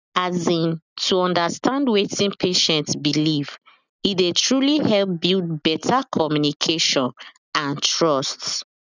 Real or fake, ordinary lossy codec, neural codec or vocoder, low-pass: real; none; none; 7.2 kHz